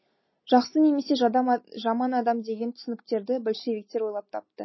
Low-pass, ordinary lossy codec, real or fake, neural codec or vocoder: 7.2 kHz; MP3, 24 kbps; real; none